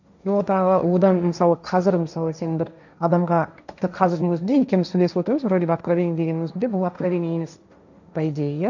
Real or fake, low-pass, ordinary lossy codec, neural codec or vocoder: fake; 7.2 kHz; none; codec, 16 kHz, 1.1 kbps, Voila-Tokenizer